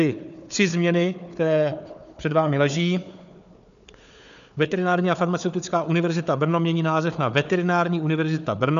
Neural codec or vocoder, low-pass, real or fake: codec, 16 kHz, 4 kbps, FunCodec, trained on Chinese and English, 50 frames a second; 7.2 kHz; fake